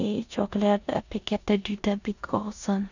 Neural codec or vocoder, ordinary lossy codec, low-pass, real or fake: codec, 16 kHz in and 24 kHz out, 0.9 kbps, LongCat-Audio-Codec, four codebook decoder; none; 7.2 kHz; fake